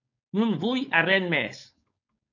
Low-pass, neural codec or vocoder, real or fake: 7.2 kHz; codec, 16 kHz, 4.8 kbps, FACodec; fake